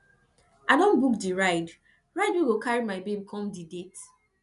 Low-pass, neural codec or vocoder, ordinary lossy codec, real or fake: 10.8 kHz; none; none; real